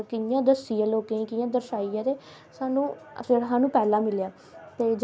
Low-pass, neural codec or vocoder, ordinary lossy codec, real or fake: none; none; none; real